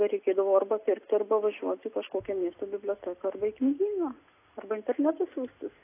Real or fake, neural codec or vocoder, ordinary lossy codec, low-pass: real; none; AAC, 24 kbps; 3.6 kHz